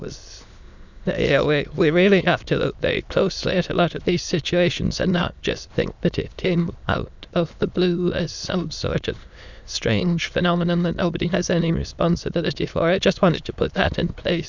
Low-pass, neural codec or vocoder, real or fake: 7.2 kHz; autoencoder, 22.05 kHz, a latent of 192 numbers a frame, VITS, trained on many speakers; fake